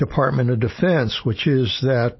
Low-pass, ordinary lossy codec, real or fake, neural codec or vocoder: 7.2 kHz; MP3, 24 kbps; real; none